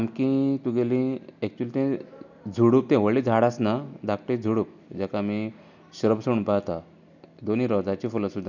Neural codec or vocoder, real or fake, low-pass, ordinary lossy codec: none; real; 7.2 kHz; none